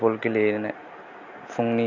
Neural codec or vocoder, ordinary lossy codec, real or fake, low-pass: none; none; real; 7.2 kHz